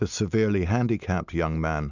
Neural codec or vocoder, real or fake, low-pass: none; real; 7.2 kHz